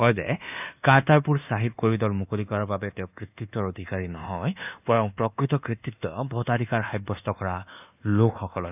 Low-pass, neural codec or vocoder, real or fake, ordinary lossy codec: 3.6 kHz; codec, 24 kHz, 1.2 kbps, DualCodec; fake; none